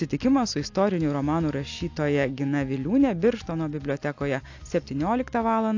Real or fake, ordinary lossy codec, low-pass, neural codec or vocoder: real; AAC, 48 kbps; 7.2 kHz; none